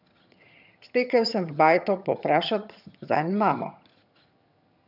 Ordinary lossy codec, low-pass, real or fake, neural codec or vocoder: none; 5.4 kHz; fake; vocoder, 22.05 kHz, 80 mel bands, HiFi-GAN